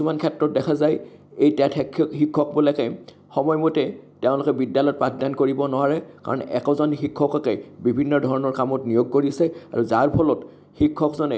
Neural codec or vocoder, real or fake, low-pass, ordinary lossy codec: none; real; none; none